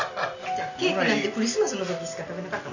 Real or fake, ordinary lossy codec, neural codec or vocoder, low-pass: real; none; none; 7.2 kHz